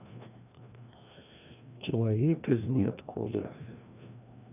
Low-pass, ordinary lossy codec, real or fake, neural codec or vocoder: 3.6 kHz; none; fake; codec, 16 kHz, 1 kbps, FreqCodec, larger model